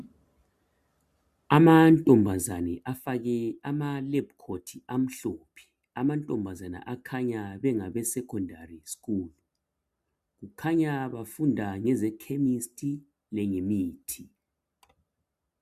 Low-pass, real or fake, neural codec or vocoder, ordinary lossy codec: 14.4 kHz; real; none; MP3, 96 kbps